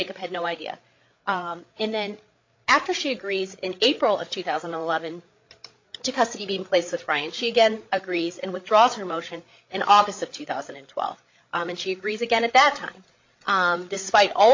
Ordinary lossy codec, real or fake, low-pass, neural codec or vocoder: MP3, 48 kbps; fake; 7.2 kHz; codec, 16 kHz, 8 kbps, FreqCodec, larger model